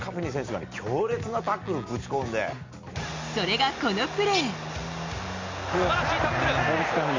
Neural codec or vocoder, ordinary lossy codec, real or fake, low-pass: none; MP3, 48 kbps; real; 7.2 kHz